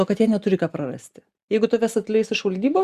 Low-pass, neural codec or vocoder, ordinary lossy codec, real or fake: 14.4 kHz; none; Opus, 64 kbps; real